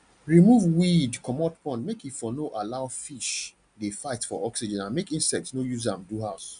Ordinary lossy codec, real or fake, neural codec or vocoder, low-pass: none; real; none; 9.9 kHz